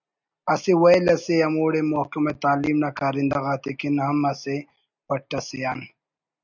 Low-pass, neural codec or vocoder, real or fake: 7.2 kHz; none; real